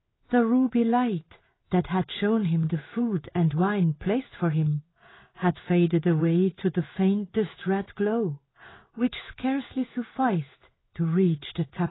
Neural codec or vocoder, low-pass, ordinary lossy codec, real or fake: codec, 16 kHz in and 24 kHz out, 1 kbps, XY-Tokenizer; 7.2 kHz; AAC, 16 kbps; fake